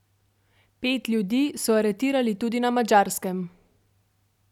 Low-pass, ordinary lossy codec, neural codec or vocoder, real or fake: 19.8 kHz; none; none; real